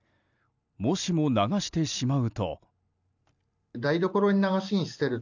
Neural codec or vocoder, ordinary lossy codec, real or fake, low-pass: none; none; real; 7.2 kHz